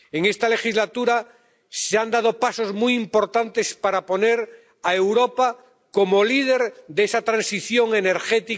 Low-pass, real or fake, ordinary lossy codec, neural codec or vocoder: none; real; none; none